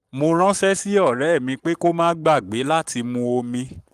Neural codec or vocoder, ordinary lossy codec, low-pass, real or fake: codec, 44.1 kHz, 7.8 kbps, Pupu-Codec; Opus, 32 kbps; 14.4 kHz; fake